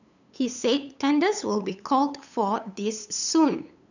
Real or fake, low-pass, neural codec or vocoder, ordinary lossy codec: fake; 7.2 kHz; codec, 16 kHz, 8 kbps, FunCodec, trained on LibriTTS, 25 frames a second; none